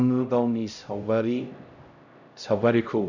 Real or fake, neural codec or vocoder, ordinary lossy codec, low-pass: fake; codec, 16 kHz, 0.5 kbps, X-Codec, HuBERT features, trained on LibriSpeech; none; 7.2 kHz